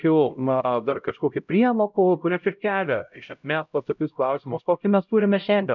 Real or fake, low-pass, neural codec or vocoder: fake; 7.2 kHz; codec, 16 kHz, 0.5 kbps, X-Codec, HuBERT features, trained on LibriSpeech